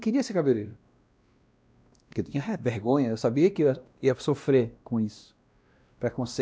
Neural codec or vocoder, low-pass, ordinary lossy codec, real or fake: codec, 16 kHz, 1 kbps, X-Codec, WavLM features, trained on Multilingual LibriSpeech; none; none; fake